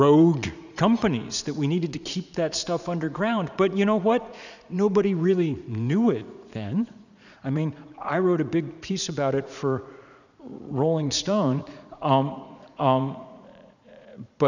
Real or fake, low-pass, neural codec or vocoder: fake; 7.2 kHz; vocoder, 44.1 kHz, 80 mel bands, Vocos